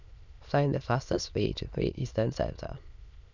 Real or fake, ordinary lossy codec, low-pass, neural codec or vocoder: fake; none; 7.2 kHz; autoencoder, 22.05 kHz, a latent of 192 numbers a frame, VITS, trained on many speakers